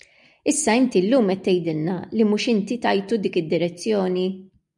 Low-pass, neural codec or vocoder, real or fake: 10.8 kHz; none; real